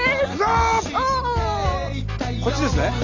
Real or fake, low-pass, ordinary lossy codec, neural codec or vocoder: real; 7.2 kHz; Opus, 32 kbps; none